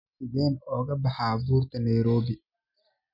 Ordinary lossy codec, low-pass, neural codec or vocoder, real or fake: none; 5.4 kHz; none; real